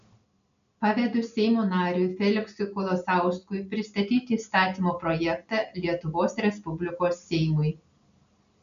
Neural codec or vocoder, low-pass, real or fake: none; 7.2 kHz; real